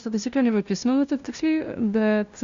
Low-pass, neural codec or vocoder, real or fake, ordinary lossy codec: 7.2 kHz; codec, 16 kHz, 0.5 kbps, FunCodec, trained on LibriTTS, 25 frames a second; fake; Opus, 64 kbps